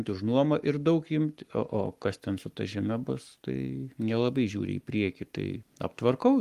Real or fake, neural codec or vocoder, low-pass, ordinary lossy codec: fake; codec, 44.1 kHz, 7.8 kbps, Pupu-Codec; 14.4 kHz; Opus, 32 kbps